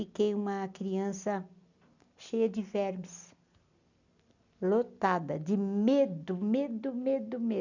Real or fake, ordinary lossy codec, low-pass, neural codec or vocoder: real; none; 7.2 kHz; none